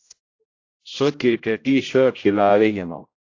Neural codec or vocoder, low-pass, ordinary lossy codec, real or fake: codec, 16 kHz, 0.5 kbps, X-Codec, HuBERT features, trained on general audio; 7.2 kHz; AAC, 48 kbps; fake